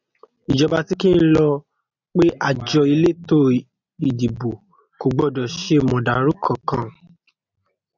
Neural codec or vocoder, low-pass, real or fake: none; 7.2 kHz; real